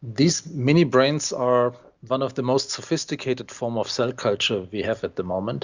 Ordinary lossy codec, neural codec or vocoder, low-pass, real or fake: Opus, 64 kbps; none; 7.2 kHz; real